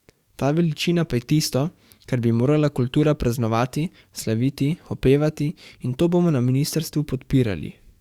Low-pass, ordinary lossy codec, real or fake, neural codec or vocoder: 19.8 kHz; Opus, 64 kbps; fake; codec, 44.1 kHz, 7.8 kbps, DAC